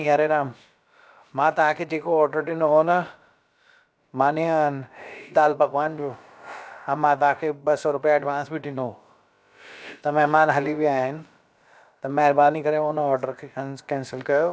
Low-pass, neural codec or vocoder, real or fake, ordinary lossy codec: none; codec, 16 kHz, about 1 kbps, DyCAST, with the encoder's durations; fake; none